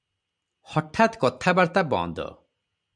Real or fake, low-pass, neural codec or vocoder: real; 9.9 kHz; none